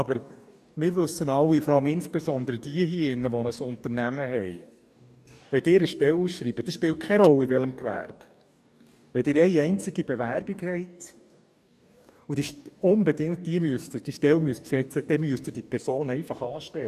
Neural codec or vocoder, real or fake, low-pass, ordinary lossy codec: codec, 44.1 kHz, 2.6 kbps, DAC; fake; 14.4 kHz; none